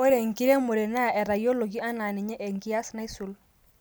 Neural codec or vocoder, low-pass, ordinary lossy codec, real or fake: none; none; none; real